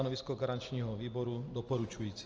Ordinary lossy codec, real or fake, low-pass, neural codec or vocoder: Opus, 24 kbps; real; 7.2 kHz; none